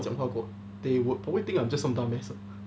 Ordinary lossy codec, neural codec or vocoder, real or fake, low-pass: none; none; real; none